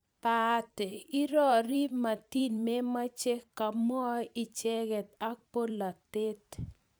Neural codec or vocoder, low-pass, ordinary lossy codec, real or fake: vocoder, 44.1 kHz, 128 mel bands every 512 samples, BigVGAN v2; none; none; fake